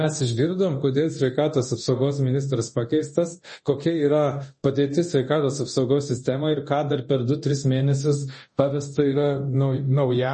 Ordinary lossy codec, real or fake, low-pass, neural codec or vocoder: MP3, 32 kbps; fake; 10.8 kHz; codec, 24 kHz, 0.9 kbps, DualCodec